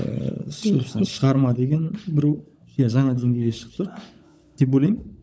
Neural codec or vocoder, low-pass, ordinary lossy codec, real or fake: codec, 16 kHz, 16 kbps, FunCodec, trained on LibriTTS, 50 frames a second; none; none; fake